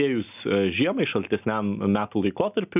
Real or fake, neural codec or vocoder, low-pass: real; none; 3.6 kHz